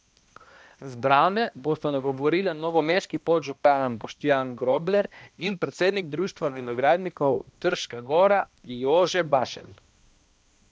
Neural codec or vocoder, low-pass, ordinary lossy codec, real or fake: codec, 16 kHz, 1 kbps, X-Codec, HuBERT features, trained on balanced general audio; none; none; fake